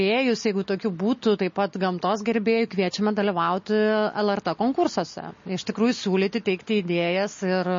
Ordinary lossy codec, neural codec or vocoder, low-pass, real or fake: MP3, 32 kbps; none; 7.2 kHz; real